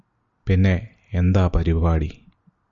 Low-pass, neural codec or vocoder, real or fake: 7.2 kHz; none; real